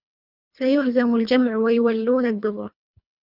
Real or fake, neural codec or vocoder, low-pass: fake; codec, 24 kHz, 3 kbps, HILCodec; 5.4 kHz